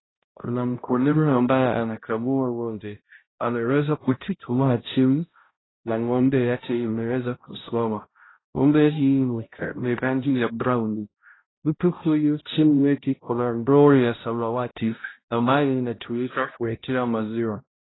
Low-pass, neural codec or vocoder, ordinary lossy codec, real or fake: 7.2 kHz; codec, 16 kHz, 0.5 kbps, X-Codec, HuBERT features, trained on balanced general audio; AAC, 16 kbps; fake